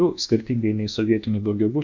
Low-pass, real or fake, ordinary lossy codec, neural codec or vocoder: 7.2 kHz; fake; Opus, 64 kbps; codec, 24 kHz, 1.2 kbps, DualCodec